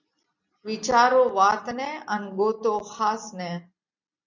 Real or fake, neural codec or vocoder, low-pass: real; none; 7.2 kHz